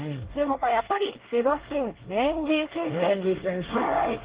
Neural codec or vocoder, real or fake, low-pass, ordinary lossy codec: codec, 24 kHz, 1 kbps, SNAC; fake; 3.6 kHz; Opus, 16 kbps